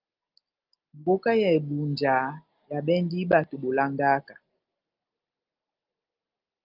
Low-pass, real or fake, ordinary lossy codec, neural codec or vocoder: 5.4 kHz; real; Opus, 32 kbps; none